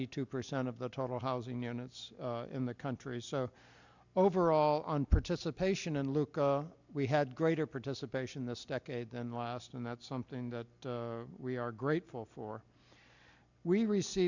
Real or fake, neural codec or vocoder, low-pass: real; none; 7.2 kHz